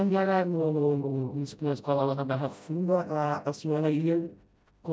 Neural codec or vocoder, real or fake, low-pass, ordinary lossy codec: codec, 16 kHz, 0.5 kbps, FreqCodec, smaller model; fake; none; none